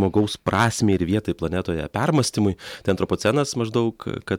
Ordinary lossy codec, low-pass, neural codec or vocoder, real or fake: MP3, 96 kbps; 19.8 kHz; none; real